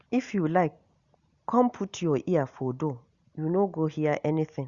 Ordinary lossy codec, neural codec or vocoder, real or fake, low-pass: Opus, 64 kbps; none; real; 7.2 kHz